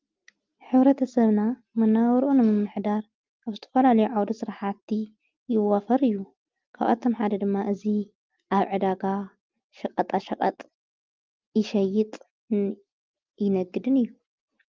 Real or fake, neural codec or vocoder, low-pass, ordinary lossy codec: real; none; 7.2 kHz; Opus, 32 kbps